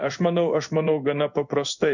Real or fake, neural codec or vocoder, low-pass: fake; codec, 16 kHz in and 24 kHz out, 1 kbps, XY-Tokenizer; 7.2 kHz